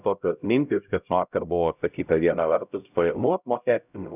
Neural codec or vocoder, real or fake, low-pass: codec, 16 kHz, 0.5 kbps, X-Codec, HuBERT features, trained on LibriSpeech; fake; 3.6 kHz